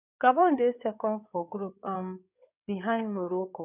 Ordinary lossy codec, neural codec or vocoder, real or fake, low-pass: none; codec, 16 kHz in and 24 kHz out, 2.2 kbps, FireRedTTS-2 codec; fake; 3.6 kHz